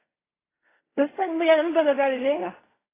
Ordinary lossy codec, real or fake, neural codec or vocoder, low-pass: AAC, 16 kbps; fake; codec, 16 kHz in and 24 kHz out, 0.4 kbps, LongCat-Audio-Codec, fine tuned four codebook decoder; 3.6 kHz